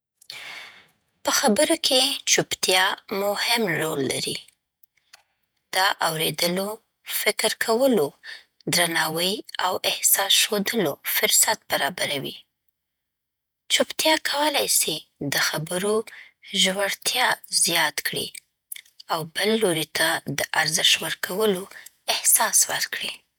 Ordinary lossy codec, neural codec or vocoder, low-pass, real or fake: none; vocoder, 48 kHz, 128 mel bands, Vocos; none; fake